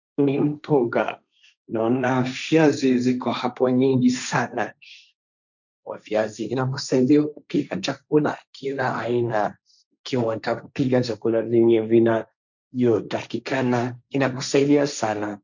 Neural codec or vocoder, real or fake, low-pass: codec, 16 kHz, 1.1 kbps, Voila-Tokenizer; fake; 7.2 kHz